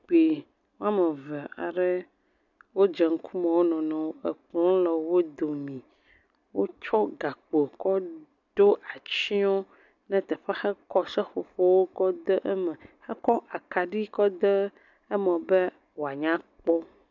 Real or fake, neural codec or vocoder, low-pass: real; none; 7.2 kHz